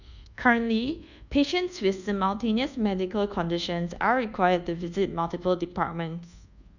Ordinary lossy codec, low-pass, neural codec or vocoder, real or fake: none; 7.2 kHz; codec, 24 kHz, 1.2 kbps, DualCodec; fake